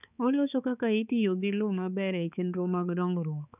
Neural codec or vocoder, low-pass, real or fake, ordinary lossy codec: codec, 16 kHz, 4 kbps, X-Codec, HuBERT features, trained on balanced general audio; 3.6 kHz; fake; none